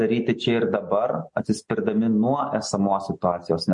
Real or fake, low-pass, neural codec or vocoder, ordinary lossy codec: real; 10.8 kHz; none; MP3, 48 kbps